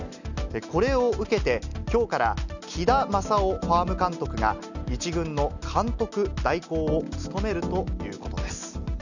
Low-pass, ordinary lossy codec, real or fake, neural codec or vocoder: 7.2 kHz; none; real; none